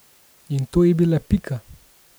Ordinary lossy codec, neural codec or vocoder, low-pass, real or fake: none; none; none; real